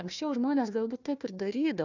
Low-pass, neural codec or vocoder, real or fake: 7.2 kHz; codec, 16 kHz, 1 kbps, FunCodec, trained on Chinese and English, 50 frames a second; fake